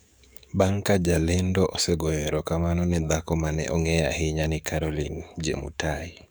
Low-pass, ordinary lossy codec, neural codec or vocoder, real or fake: none; none; codec, 44.1 kHz, 7.8 kbps, DAC; fake